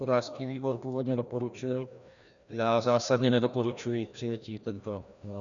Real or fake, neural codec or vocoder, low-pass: fake; codec, 16 kHz, 1 kbps, FreqCodec, larger model; 7.2 kHz